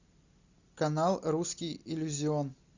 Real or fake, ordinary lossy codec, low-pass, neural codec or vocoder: real; Opus, 64 kbps; 7.2 kHz; none